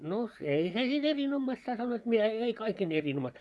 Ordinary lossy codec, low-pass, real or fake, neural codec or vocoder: none; none; fake; vocoder, 24 kHz, 100 mel bands, Vocos